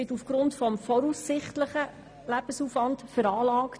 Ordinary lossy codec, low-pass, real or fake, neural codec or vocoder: none; none; real; none